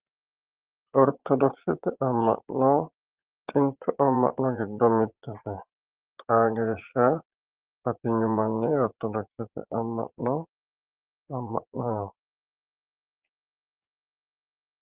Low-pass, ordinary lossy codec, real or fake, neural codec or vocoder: 3.6 kHz; Opus, 16 kbps; fake; vocoder, 22.05 kHz, 80 mel bands, Vocos